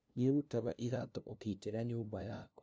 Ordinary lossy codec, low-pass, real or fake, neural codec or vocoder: none; none; fake; codec, 16 kHz, 0.5 kbps, FunCodec, trained on LibriTTS, 25 frames a second